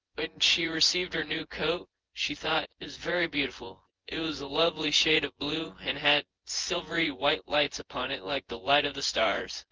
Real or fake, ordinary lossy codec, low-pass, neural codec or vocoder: fake; Opus, 16 kbps; 7.2 kHz; vocoder, 24 kHz, 100 mel bands, Vocos